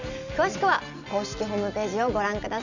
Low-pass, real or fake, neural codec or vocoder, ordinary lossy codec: 7.2 kHz; real; none; none